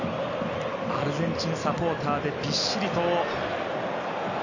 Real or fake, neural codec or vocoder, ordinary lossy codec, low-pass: real; none; none; 7.2 kHz